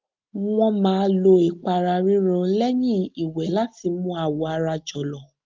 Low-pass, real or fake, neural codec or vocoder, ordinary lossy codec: 7.2 kHz; real; none; Opus, 32 kbps